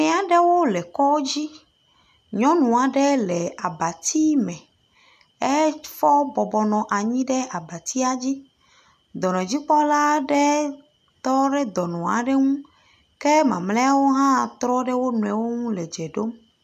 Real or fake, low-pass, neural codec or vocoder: real; 14.4 kHz; none